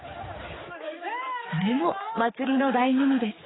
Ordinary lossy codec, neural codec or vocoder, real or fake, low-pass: AAC, 16 kbps; codec, 16 kHz, 4 kbps, X-Codec, HuBERT features, trained on general audio; fake; 7.2 kHz